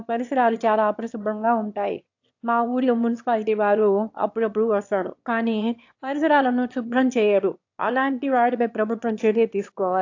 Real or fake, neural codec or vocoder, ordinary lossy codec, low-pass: fake; autoencoder, 22.05 kHz, a latent of 192 numbers a frame, VITS, trained on one speaker; AAC, 48 kbps; 7.2 kHz